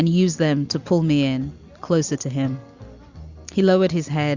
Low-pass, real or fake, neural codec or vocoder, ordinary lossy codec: 7.2 kHz; real; none; Opus, 64 kbps